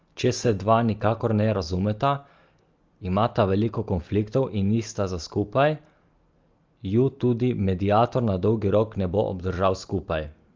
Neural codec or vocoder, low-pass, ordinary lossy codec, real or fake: none; 7.2 kHz; Opus, 24 kbps; real